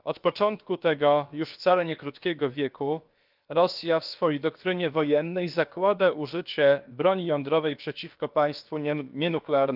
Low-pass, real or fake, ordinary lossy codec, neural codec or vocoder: 5.4 kHz; fake; Opus, 24 kbps; codec, 16 kHz, about 1 kbps, DyCAST, with the encoder's durations